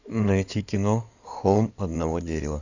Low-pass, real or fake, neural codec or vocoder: 7.2 kHz; fake; codec, 16 kHz in and 24 kHz out, 2.2 kbps, FireRedTTS-2 codec